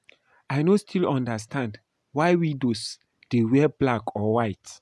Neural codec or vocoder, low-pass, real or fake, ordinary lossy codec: none; none; real; none